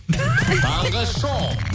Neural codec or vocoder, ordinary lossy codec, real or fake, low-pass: none; none; real; none